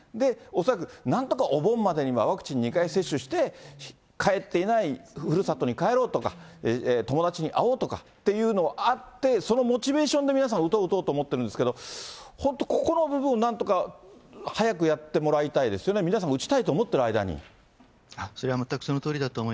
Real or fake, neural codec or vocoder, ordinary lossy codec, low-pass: real; none; none; none